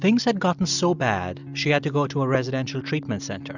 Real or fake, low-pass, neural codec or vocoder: real; 7.2 kHz; none